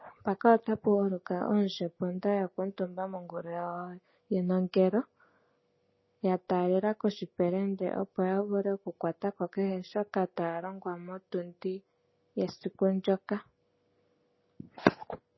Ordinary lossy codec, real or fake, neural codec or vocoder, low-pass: MP3, 24 kbps; real; none; 7.2 kHz